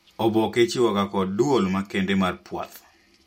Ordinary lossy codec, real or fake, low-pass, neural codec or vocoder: MP3, 64 kbps; real; 19.8 kHz; none